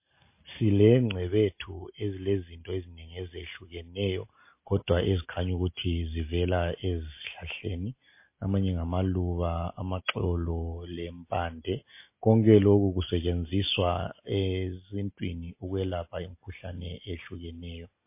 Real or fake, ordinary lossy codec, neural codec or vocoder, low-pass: real; MP3, 24 kbps; none; 3.6 kHz